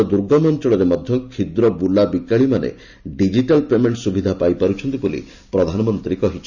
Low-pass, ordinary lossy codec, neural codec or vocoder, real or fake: 7.2 kHz; none; none; real